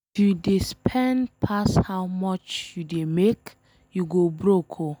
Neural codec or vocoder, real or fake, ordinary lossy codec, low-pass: none; real; none; none